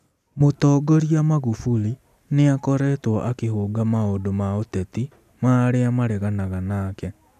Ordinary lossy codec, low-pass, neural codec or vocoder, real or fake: none; 14.4 kHz; none; real